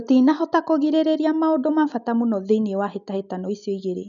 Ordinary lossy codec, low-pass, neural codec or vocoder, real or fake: none; 7.2 kHz; none; real